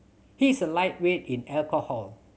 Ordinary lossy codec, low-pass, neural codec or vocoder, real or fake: none; none; none; real